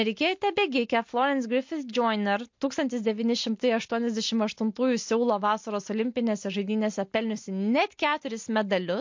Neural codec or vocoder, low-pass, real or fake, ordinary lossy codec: none; 7.2 kHz; real; MP3, 48 kbps